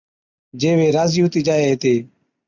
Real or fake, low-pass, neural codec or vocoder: real; 7.2 kHz; none